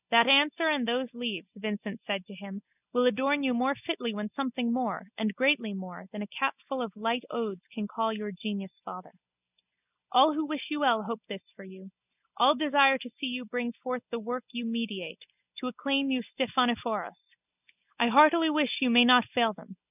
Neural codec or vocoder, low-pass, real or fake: none; 3.6 kHz; real